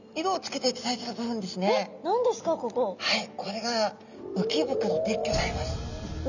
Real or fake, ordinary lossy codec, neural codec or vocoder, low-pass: real; none; none; 7.2 kHz